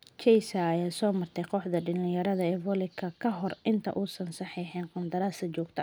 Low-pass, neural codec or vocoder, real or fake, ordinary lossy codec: none; none; real; none